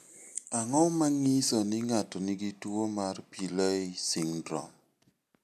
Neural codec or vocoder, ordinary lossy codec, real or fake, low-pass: none; none; real; 14.4 kHz